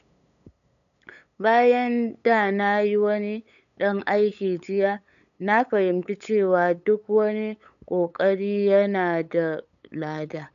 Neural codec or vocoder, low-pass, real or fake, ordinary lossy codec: codec, 16 kHz, 8 kbps, FunCodec, trained on LibriTTS, 25 frames a second; 7.2 kHz; fake; none